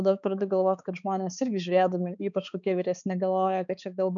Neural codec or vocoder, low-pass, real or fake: codec, 16 kHz, 4 kbps, X-Codec, HuBERT features, trained on balanced general audio; 7.2 kHz; fake